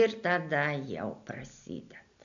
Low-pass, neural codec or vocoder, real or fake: 7.2 kHz; none; real